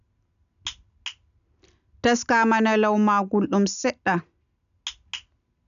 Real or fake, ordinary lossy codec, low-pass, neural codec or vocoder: real; none; 7.2 kHz; none